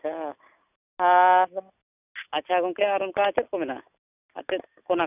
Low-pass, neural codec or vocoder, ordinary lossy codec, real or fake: 3.6 kHz; none; none; real